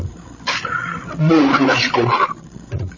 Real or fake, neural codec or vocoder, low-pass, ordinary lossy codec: fake; codec, 16 kHz, 16 kbps, FreqCodec, larger model; 7.2 kHz; MP3, 32 kbps